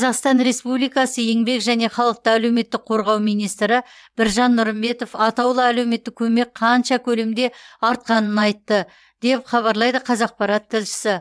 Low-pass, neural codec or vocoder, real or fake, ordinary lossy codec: none; vocoder, 22.05 kHz, 80 mel bands, WaveNeXt; fake; none